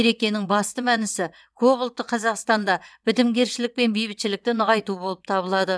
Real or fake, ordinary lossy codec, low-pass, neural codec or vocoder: fake; none; none; vocoder, 22.05 kHz, 80 mel bands, WaveNeXt